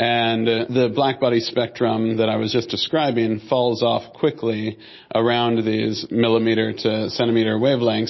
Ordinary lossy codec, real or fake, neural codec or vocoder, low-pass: MP3, 24 kbps; real; none; 7.2 kHz